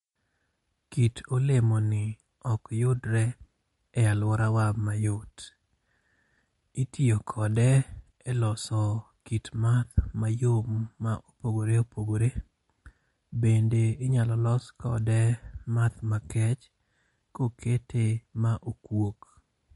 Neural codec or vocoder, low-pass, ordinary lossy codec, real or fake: none; 19.8 kHz; MP3, 48 kbps; real